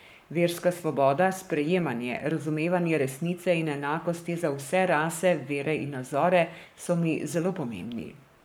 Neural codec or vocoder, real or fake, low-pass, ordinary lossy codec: codec, 44.1 kHz, 7.8 kbps, Pupu-Codec; fake; none; none